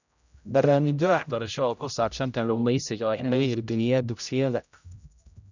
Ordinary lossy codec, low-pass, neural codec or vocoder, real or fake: none; 7.2 kHz; codec, 16 kHz, 0.5 kbps, X-Codec, HuBERT features, trained on general audio; fake